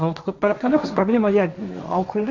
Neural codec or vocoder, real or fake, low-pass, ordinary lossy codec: codec, 16 kHz, 1.1 kbps, Voila-Tokenizer; fake; 7.2 kHz; none